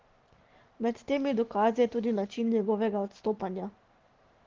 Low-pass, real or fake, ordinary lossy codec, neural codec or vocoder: 7.2 kHz; real; Opus, 16 kbps; none